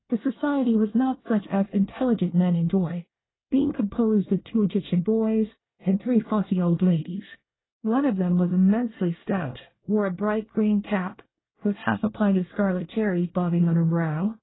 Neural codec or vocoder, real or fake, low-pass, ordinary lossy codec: codec, 24 kHz, 1 kbps, SNAC; fake; 7.2 kHz; AAC, 16 kbps